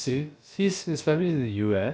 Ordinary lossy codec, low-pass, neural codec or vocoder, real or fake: none; none; codec, 16 kHz, about 1 kbps, DyCAST, with the encoder's durations; fake